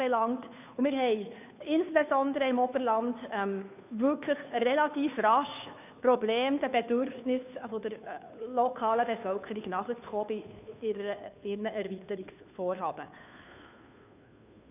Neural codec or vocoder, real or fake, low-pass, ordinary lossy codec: codec, 16 kHz, 2 kbps, FunCodec, trained on Chinese and English, 25 frames a second; fake; 3.6 kHz; none